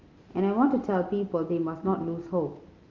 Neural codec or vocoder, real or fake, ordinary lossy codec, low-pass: none; real; Opus, 32 kbps; 7.2 kHz